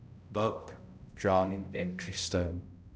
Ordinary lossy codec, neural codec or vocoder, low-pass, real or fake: none; codec, 16 kHz, 0.5 kbps, X-Codec, HuBERT features, trained on balanced general audio; none; fake